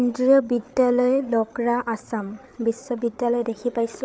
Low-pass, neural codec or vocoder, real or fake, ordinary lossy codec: none; codec, 16 kHz, 8 kbps, FreqCodec, larger model; fake; none